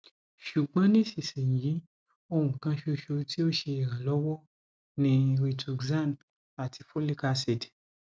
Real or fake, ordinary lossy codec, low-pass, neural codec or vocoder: real; none; none; none